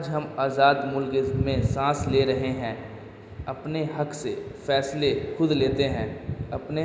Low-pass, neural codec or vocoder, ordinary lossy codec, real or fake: none; none; none; real